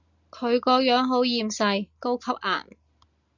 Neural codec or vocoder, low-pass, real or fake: none; 7.2 kHz; real